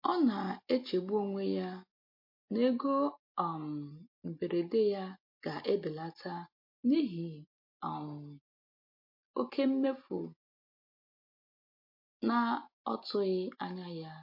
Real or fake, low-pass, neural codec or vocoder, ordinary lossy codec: real; 5.4 kHz; none; MP3, 32 kbps